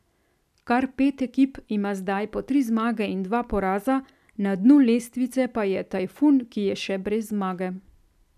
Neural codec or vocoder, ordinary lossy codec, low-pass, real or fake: none; none; 14.4 kHz; real